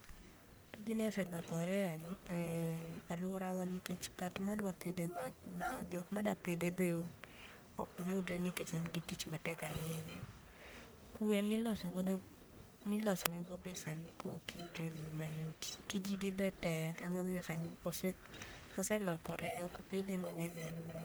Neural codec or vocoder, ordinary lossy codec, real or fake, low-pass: codec, 44.1 kHz, 1.7 kbps, Pupu-Codec; none; fake; none